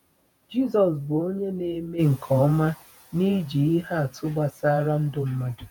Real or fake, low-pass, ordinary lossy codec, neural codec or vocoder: fake; none; none; vocoder, 48 kHz, 128 mel bands, Vocos